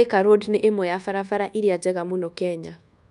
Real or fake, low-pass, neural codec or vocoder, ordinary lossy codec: fake; 10.8 kHz; codec, 24 kHz, 1.2 kbps, DualCodec; none